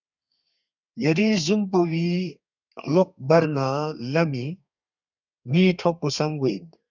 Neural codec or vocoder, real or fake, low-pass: codec, 32 kHz, 1.9 kbps, SNAC; fake; 7.2 kHz